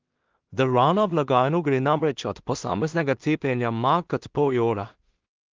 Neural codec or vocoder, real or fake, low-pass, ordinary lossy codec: codec, 16 kHz in and 24 kHz out, 0.4 kbps, LongCat-Audio-Codec, two codebook decoder; fake; 7.2 kHz; Opus, 32 kbps